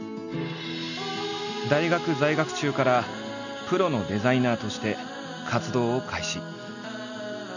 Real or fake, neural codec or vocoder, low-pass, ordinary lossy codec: real; none; 7.2 kHz; none